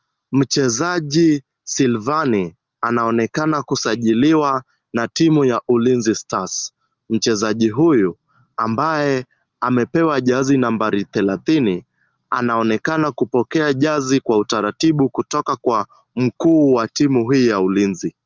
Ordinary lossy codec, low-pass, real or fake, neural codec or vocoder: Opus, 32 kbps; 7.2 kHz; real; none